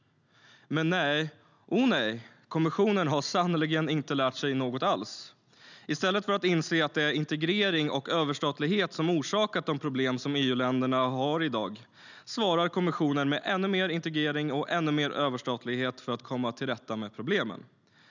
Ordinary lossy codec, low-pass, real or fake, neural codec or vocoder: none; 7.2 kHz; real; none